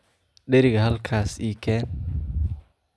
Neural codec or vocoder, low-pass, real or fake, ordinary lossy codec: none; none; real; none